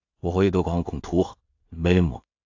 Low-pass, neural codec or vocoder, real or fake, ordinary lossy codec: 7.2 kHz; codec, 16 kHz in and 24 kHz out, 0.4 kbps, LongCat-Audio-Codec, two codebook decoder; fake; AAC, 48 kbps